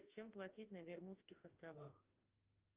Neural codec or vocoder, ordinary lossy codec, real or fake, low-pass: autoencoder, 48 kHz, 32 numbers a frame, DAC-VAE, trained on Japanese speech; Opus, 24 kbps; fake; 3.6 kHz